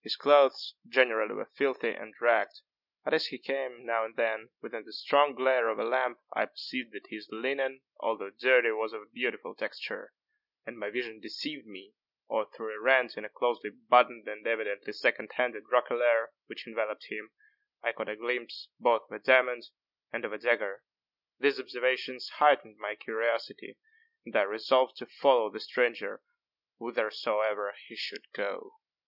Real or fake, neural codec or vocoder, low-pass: real; none; 5.4 kHz